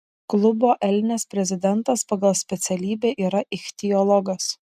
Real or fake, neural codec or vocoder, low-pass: real; none; 14.4 kHz